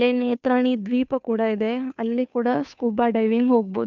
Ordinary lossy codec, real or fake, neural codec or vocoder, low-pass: none; fake; codec, 16 kHz, 2 kbps, FunCodec, trained on Chinese and English, 25 frames a second; 7.2 kHz